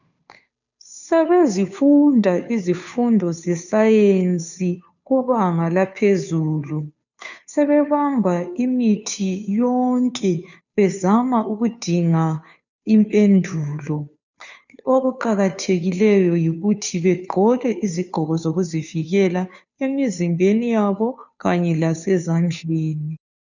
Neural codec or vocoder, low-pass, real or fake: codec, 16 kHz, 2 kbps, FunCodec, trained on Chinese and English, 25 frames a second; 7.2 kHz; fake